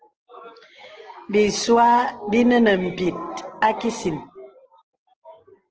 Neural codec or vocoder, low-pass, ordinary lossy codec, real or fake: none; 7.2 kHz; Opus, 16 kbps; real